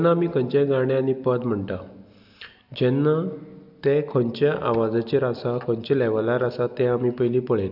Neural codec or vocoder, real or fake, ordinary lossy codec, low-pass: none; real; none; 5.4 kHz